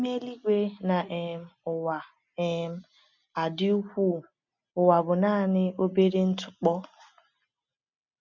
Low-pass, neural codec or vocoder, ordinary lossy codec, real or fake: 7.2 kHz; none; none; real